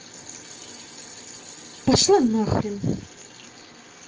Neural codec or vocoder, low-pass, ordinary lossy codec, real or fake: none; 7.2 kHz; Opus, 24 kbps; real